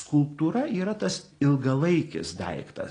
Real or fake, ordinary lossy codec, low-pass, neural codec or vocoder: real; AAC, 32 kbps; 9.9 kHz; none